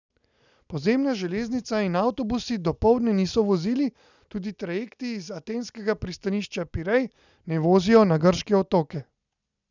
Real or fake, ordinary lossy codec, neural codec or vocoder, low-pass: real; none; none; 7.2 kHz